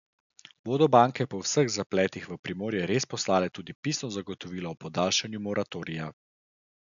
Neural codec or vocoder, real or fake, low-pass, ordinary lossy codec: none; real; 7.2 kHz; none